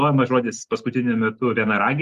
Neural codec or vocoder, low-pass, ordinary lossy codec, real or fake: none; 14.4 kHz; Opus, 24 kbps; real